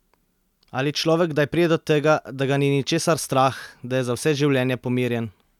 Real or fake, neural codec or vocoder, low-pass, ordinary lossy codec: real; none; 19.8 kHz; none